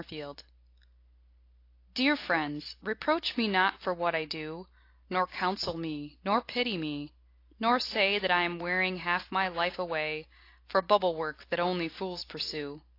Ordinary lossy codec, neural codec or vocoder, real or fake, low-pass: AAC, 32 kbps; none; real; 5.4 kHz